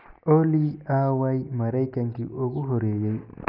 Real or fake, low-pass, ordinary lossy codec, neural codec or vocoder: real; 5.4 kHz; none; none